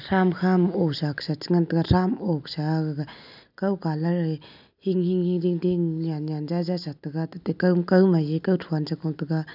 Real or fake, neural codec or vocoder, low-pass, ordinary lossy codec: real; none; 5.4 kHz; none